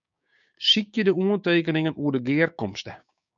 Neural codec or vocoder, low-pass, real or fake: codec, 16 kHz, 6 kbps, DAC; 7.2 kHz; fake